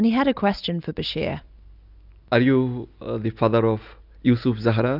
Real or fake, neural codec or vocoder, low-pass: real; none; 5.4 kHz